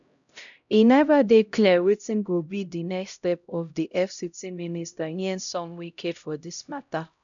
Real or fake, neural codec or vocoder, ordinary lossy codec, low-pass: fake; codec, 16 kHz, 0.5 kbps, X-Codec, HuBERT features, trained on LibriSpeech; none; 7.2 kHz